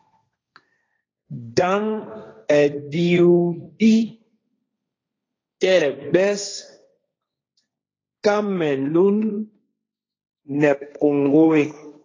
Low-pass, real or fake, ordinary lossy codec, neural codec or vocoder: 7.2 kHz; fake; AAC, 32 kbps; codec, 16 kHz, 1.1 kbps, Voila-Tokenizer